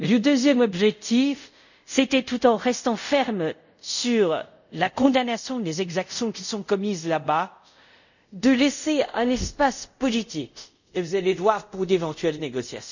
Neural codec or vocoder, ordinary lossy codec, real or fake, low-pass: codec, 24 kHz, 0.5 kbps, DualCodec; none; fake; 7.2 kHz